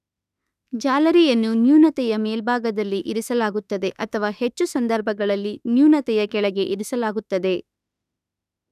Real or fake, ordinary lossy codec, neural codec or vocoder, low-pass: fake; none; autoencoder, 48 kHz, 32 numbers a frame, DAC-VAE, trained on Japanese speech; 14.4 kHz